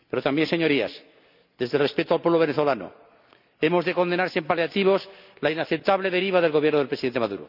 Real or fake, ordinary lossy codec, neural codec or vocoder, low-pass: real; none; none; 5.4 kHz